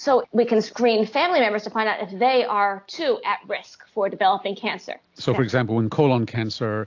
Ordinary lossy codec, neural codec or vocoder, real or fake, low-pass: AAC, 48 kbps; none; real; 7.2 kHz